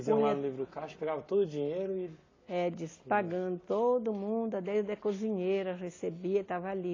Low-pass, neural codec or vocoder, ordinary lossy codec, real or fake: 7.2 kHz; none; AAC, 32 kbps; real